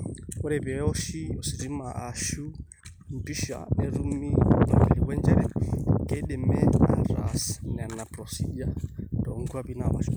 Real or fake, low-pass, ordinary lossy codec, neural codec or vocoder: real; none; none; none